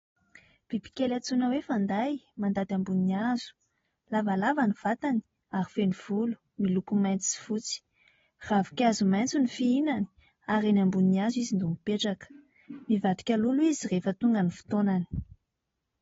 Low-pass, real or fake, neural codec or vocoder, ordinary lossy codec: 7.2 kHz; real; none; AAC, 24 kbps